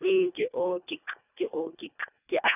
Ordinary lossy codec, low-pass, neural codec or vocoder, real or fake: none; 3.6 kHz; codec, 24 kHz, 1.5 kbps, HILCodec; fake